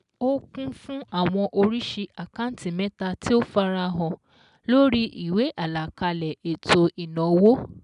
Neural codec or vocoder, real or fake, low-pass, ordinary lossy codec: none; real; 10.8 kHz; none